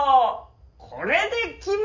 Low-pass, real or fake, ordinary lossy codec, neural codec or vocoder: 7.2 kHz; real; Opus, 64 kbps; none